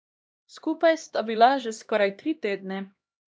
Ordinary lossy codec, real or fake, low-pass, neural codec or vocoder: none; fake; none; codec, 16 kHz, 1 kbps, X-Codec, HuBERT features, trained on LibriSpeech